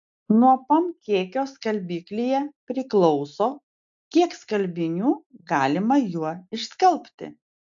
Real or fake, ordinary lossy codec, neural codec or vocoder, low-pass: real; AAC, 64 kbps; none; 7.2 kHz